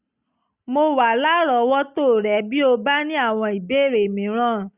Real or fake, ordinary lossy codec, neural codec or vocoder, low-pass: real; Opus, 64 kbps; none; 3.6 kHz